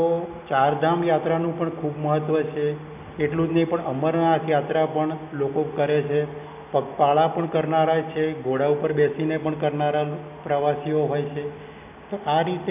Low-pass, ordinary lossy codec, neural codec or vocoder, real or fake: 3.6 kHz; none; none; real